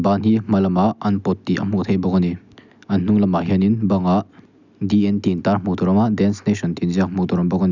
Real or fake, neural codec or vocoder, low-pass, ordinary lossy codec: real; none; 7.2 kHz; none